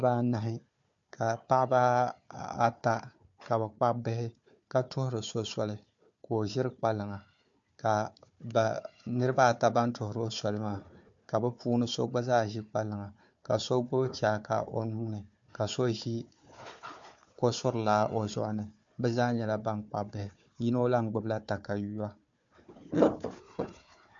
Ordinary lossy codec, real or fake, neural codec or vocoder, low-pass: MP3, 48 kbps; fake; codec, 16 kHz, 4 kbps, FunCodec, trained on Chinese and English, 50 frames a second; 7.2 kHz